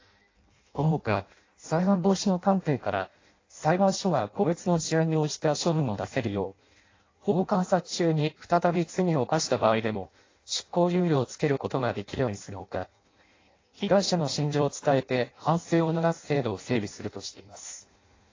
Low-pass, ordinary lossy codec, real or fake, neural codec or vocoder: 7.2 kHz; AAC, 32 kbps; fake; codec, 16 kHz in and 24 kHz out, 0.6 kbps, FireRedTTS-2 codec